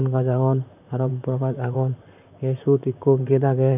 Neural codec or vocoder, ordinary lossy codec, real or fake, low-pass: vocoder, 44.1 kHz, 128 mel bands, Pupu-Vocoder; none; fake; 3.6 kHz